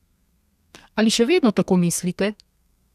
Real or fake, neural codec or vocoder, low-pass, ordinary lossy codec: fake; codec, 32 kHz, 1.9 kbps, SNAC; 14.4 kHz; none